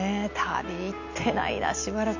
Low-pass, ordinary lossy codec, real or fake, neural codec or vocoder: 7.2 kHz; none; real; none